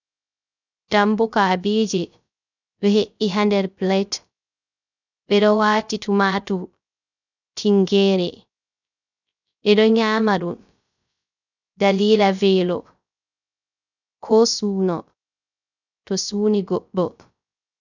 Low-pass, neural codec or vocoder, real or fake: 7.2 kHz; codec, 16 kHz, 0.3 kbps, FocalCodec; fake